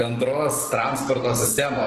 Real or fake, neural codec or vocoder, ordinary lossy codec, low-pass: fake; codec, 44.1 kHz, 7.8 kbps, DAC; Opus, 16 kbps; 14.4 kHz